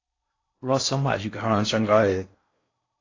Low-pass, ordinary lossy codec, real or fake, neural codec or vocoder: 7.2 kHz; AAC, 32 kbps; fake; codec, 16 kHz in and 24 kHz out, 0.6 kbps, FocalCodec, streaming, 4096 codes